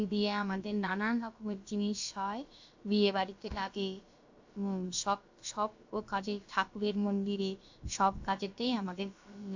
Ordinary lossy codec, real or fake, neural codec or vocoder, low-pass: AAC, 48 kbps; fake; codec, 16 kHz, about 1 kbps, DyCAST, with the encoder's durations; 7.2 kHz